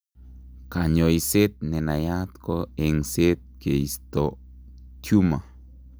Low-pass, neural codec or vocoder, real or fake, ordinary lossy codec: none; none; real; none